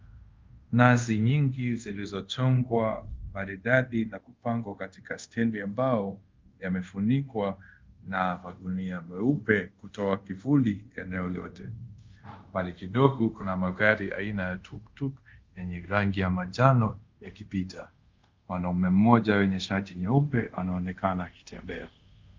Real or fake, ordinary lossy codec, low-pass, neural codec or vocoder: fake; Opus, 24 kbps; 7.2 kHz; codec, 24 kHz, 0.5 kbps, DualCodec